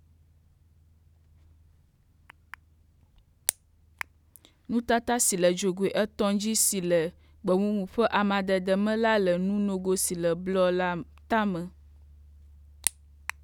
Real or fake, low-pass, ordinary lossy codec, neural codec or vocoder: real; 19.8 kHz; none; none